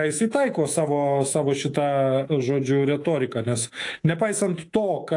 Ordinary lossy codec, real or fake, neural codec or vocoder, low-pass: AAC, 48 kbps; fake; codec, 24 kHz, 3.1 kbps, DualCodec; 10.8 kHz